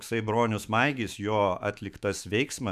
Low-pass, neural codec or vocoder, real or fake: 14.4 kHz; autoencoder, 48 kHz, 128 numbers a frame, DAC-VAE, trained on Japanese speech; fake